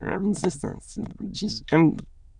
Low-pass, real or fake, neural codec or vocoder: 9.9 kHz; fake; autoencoder, 22.05 kHz, a latent of 192 numbers a frame, VITS, trained on many speakers